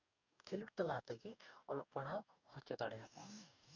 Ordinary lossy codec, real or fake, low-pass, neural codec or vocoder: none; fake; 7.2 kHz; codec, 44.1 kHz, 2.6 kbps, DAC